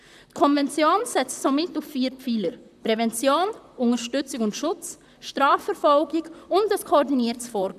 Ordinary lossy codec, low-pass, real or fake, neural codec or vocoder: none; 14.4 kHz; fake; vocoder, 44.1 kHz, 128 mel bands, Pupu-Vocoder